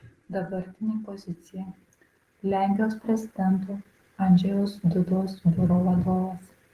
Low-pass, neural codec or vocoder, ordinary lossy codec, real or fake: 14.4 kHz; none; Opus, 16 kbps; real